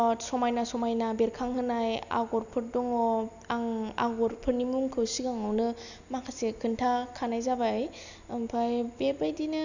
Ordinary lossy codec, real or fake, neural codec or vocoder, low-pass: none; real; none; 7.2 kHz